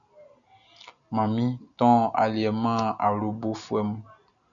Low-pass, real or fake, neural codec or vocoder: 7.2 kHz; real; none